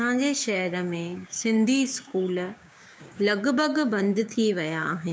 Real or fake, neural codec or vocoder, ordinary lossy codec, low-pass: fake; codec, 16 kHz, 6 kbps, DAC; none; none